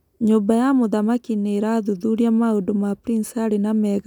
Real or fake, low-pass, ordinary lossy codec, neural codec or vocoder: real; 19.8 kHz; none; none